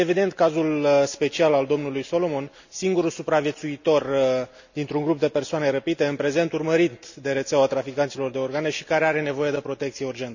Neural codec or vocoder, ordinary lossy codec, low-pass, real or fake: none; none; 7.2 kHz; real